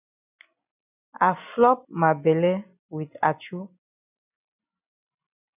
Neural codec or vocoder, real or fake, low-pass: none; real; 3.6 kHz